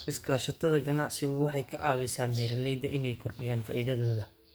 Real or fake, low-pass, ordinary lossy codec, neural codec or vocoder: fake; none; none; codec, 44.1 kHz, 2.6 kbps, SNAC